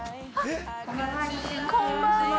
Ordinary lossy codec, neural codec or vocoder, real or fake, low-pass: none; none; real; none